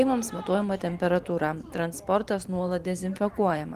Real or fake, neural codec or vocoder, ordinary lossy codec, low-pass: fake; vocoder, 44.1 kHz, 128 mel bands every 512 samples, BigVGAN v2; Opus, 24 kbps; 14.4 kHz